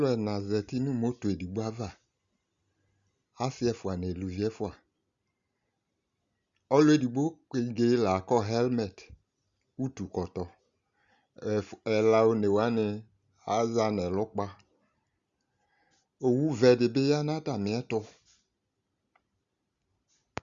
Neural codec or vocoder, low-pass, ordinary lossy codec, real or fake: none; 7.2 kHz; Opus, 64 kbps; real